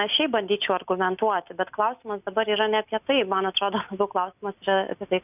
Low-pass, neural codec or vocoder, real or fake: 3.6 kHz; none; real